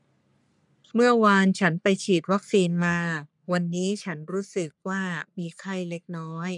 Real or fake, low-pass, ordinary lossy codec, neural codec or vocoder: fake; 10.8 kHz; none; codec, 44.1 kHz, 3.4 kbps, Pupu-Codec